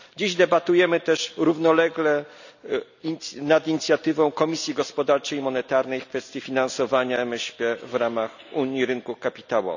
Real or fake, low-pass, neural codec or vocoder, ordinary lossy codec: real; 7.2 kHz; none; none